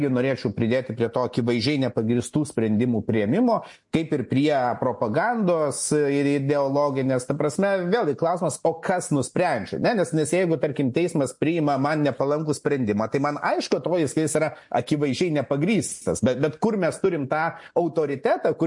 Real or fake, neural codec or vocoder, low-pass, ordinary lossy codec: real; none; 10.8 kHz; MP3, 48 kbps